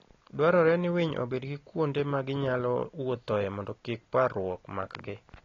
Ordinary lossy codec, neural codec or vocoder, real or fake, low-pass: AAC, 32 kbps; none; real; 7.2 kHz